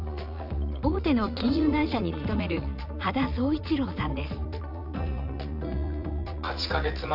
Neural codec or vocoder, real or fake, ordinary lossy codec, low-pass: vocoder, 44.1 kHz, 80 mel bands, Vocos; fake; none; 5.4 kHz